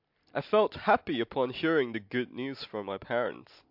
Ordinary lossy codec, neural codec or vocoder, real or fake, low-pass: MP3, 48 kbps; none; real; 5.4 kHz